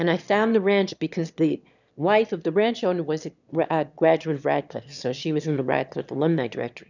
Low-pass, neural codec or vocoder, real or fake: 7.2 kHz; autoencoder, 22.05 kHz, a latent of 192 numbers a frame, VITS, trained on one speaker; fake